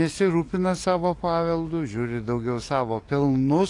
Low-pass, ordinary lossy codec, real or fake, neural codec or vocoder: 10.8 kHz; AAC, 48 kbps; fake; codec, 44.1 kHz, 7.8 kbps, DAC